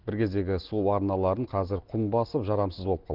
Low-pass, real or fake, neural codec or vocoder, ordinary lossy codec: 5.4 kHz; real; none; Opus, 16 kbps